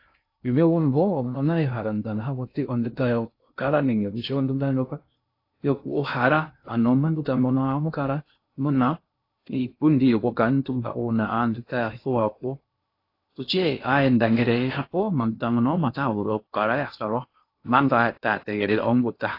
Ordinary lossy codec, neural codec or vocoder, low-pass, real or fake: AAC, 32 kbps; codec, 16 kHz in and 24 kHz out, 0.6 kbps, FocalCodec, streaming, 2048 codes; 5.4 kHz; fake